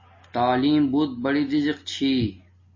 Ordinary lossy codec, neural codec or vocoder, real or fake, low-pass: MP3, 32 kbps; none; real; 7.2 kHz